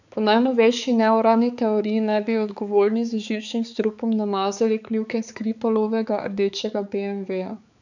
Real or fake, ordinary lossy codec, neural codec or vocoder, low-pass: fake; none; codec, 16 kHz, 4 kbps, X-Codec, HuBERT features, trained on balanced general audio; 7.2 kHz